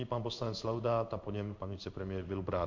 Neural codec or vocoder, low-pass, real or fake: codec, 16 kHz in and 24 kHz out, 1 kbps, XY-Tokenizer; 7.2 kHz; fake